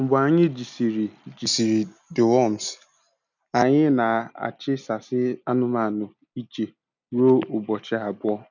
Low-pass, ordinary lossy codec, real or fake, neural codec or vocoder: 7.2 kHz; none; real; none